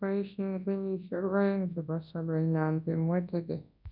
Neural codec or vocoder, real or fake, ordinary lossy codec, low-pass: codec, 24 kHz, 0.9 kbps, WavTokenizer, large speech release; fake; none; 5.4 kHz